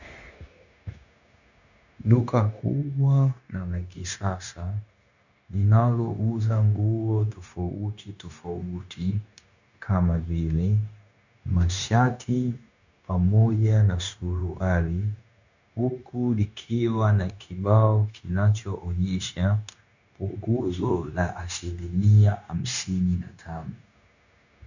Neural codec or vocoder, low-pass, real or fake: codec, 16 kHz, 0.9 kbps, LongCat-Audio-Codec; 7.2 kHz; fake